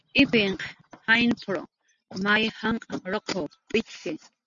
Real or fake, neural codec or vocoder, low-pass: real; none; 7.2 kHz